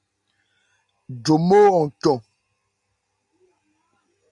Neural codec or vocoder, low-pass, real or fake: none; 10.8 kHz; real